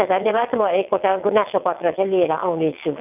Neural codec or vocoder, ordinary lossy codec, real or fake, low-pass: vocoder, 22.05 kHz, 80 mel bands, WaveNeXt; none; fake; 3.6 kHz